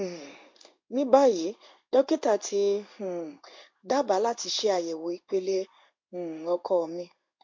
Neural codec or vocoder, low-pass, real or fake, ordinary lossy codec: codec, 16 kHz in and 24 kHz out, 1 kbps, XY-Tokenizer; 7.2 kHz; fake; MP3, 48 kbps